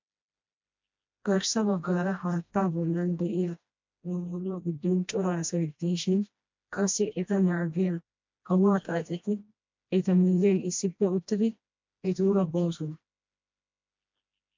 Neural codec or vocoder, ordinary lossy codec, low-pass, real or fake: codec, 16 kHz, 1 kbps, FreqCodec, smaller model; MP3, 64 kbps; 7.2 kHz; fake